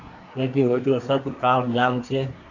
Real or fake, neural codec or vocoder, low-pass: fake; codec, 24 kHz, 1 kbps, SNAC; 7.2 kHz